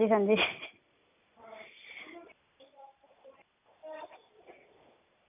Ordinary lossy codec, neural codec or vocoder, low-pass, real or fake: none; none; 3.6 kHz; real